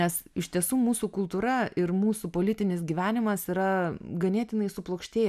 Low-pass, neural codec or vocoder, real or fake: 14.4 kHz; none; real